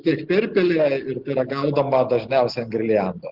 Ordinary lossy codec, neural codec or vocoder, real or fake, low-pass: Opus, 16 kbps; none; real; 5.4 kHz